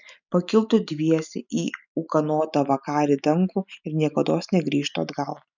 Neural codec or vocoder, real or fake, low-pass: none; real; 7.2 kHz